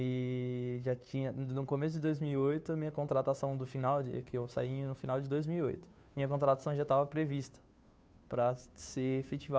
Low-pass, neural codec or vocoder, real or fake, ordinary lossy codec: none; codec, 16 kHz, 2 kbps, FunCodec, trained on Chinese and English, 25 frames a second; fake; none